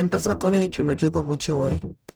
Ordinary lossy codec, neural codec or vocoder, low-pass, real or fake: none; codec, 44.1 kHz, 0.9 kbps, DAC; none; fake